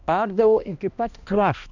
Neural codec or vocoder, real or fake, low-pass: codec, 16 kHz, 1 kbps, X-Codec, HuBERT features, trained on balanced general audio; fake; 7.2 kHz